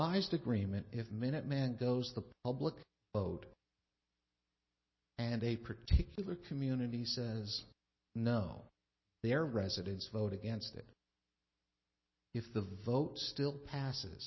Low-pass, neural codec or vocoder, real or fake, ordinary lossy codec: 7.2 kHz; none; real; MP3, 24 kbps